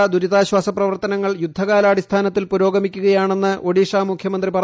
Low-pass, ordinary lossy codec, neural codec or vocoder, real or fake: none; none; none; real